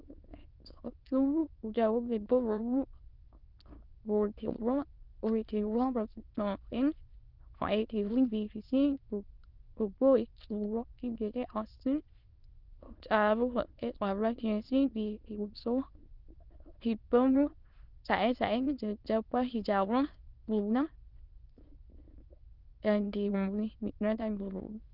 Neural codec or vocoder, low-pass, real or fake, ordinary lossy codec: autoencoder, 22.05 kHz, a latent of 192 numbers a frame, VITS, trained on many speakers; 5.4 kHz; fake; Opus, 32 kbps